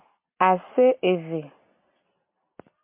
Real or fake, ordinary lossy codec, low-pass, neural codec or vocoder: real; MP3, 32 kbps; 3.6 kHz; none